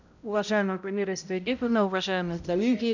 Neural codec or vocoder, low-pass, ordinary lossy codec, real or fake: codec, 16 kHz, 0.5 kbps, X-Codec, HuBERT features, trained on balanced general audio; 7.2 kHz; none; fake